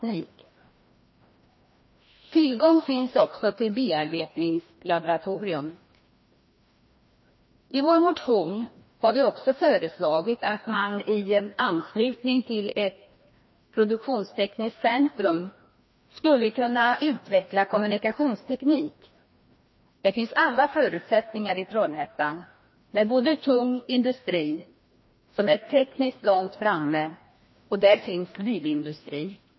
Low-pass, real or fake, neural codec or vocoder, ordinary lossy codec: 7.2 kHz; fake; codec, 16 kHz, 1 kbps, FreqCodec, larger model; MP3, 24 kbps